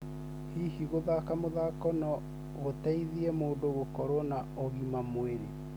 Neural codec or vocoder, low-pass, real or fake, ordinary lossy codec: none; none; real; none